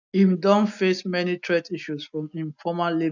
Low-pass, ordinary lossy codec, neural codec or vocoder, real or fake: 7.2 kHz; none; none; real